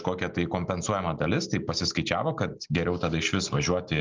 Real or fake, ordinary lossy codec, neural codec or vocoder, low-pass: real; Opus, 32 kbps; none; 7.2 kHz